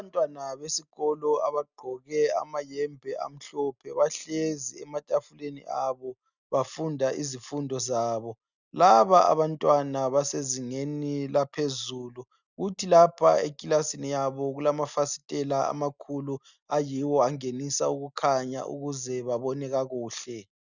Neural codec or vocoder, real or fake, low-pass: none; real; 7.2 kHz